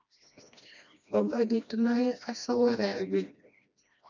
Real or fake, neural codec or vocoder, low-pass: fake; codec, 16 kHz, 1 kbps, FreqCodec, smaller model; 7.2 kHz